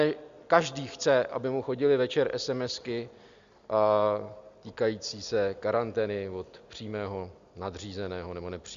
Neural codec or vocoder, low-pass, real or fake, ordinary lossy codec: none; 7.2 kHz; real; Opus, 64 kbps